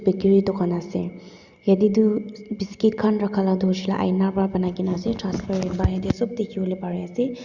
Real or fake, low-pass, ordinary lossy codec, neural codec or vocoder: real; 7.2 kHz; Opus, 64 kbps; none